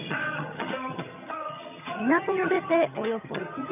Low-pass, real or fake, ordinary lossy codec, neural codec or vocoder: 3.6 kHz; fake; none; vocoder, 22.05 kHz, 80 mel bands, HiFi-GAN